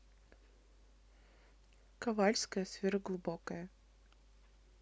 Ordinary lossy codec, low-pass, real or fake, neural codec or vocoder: none; none; real; none